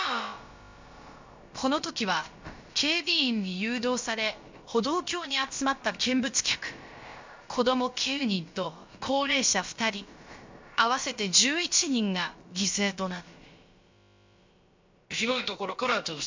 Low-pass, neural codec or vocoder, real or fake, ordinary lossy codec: 7.2 kHz; codec, 16 kHz, about 1 kbps, DyCAST, with the encoder's durations; fake; MP3, 64 kbps